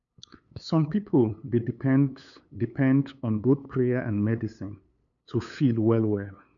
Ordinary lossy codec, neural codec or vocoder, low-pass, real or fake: none; codec, 16 kHz, 8 kbps, FunCodec, trained on LibriTTS, 25 frames a second; 7.2 kHz; fake